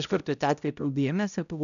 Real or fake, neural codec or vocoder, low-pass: fake; codec, 16 kHz, 0.5 kbps, X-Codec, HuBERT features, trained on balanced general audio; 7.2 kHz